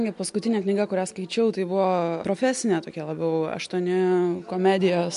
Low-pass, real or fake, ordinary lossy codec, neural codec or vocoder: 10.8 kHz; real; MP3, 64 kbps; none